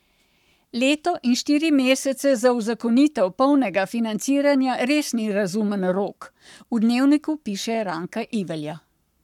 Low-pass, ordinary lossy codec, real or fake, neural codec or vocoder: 19.8 kHz; none; fake; codec, 44.1 kHz, 7.8 kbps, Pupu-Codec